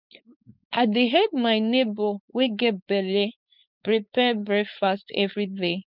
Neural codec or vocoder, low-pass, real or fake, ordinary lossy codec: codec, 16 kHz, 4.8 kbps, FACodec; 5.4 kHz; fake; none